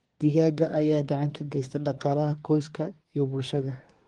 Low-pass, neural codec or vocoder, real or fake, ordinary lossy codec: 10.8 kHz; codec, 24 kHz, 1 kbps, SNAC; fake; Opus, 24 kbps